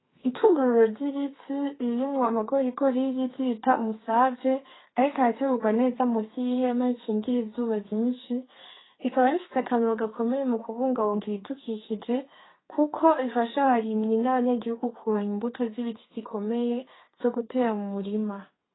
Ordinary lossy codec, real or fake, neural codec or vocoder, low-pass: AAC, 16 kbps; fake; codec, 32 kHz, 1.9 kbps, SNAC; 7.2 kHz